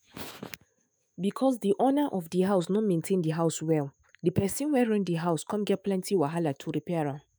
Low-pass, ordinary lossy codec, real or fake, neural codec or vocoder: none; none; fake; autoencoder, 48 kHz, 128 numbers a frame, DAC-VAE, trained on Japanese speech